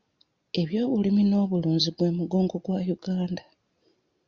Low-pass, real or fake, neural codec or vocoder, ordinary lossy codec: 7.2 kHz; real; none; Opus, 64 kbps